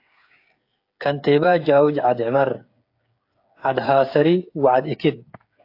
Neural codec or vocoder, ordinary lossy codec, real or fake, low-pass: codec, 16 kHz, 8 kbps, FreqCodec, smaller model; AAC, 32 kbps; fake; 5.4 kHz